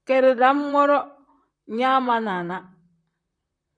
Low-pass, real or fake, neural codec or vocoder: 9.9 kHz; fake; vocoder, 44.1 kHz, 128 mel bands, Pupu-Vocoder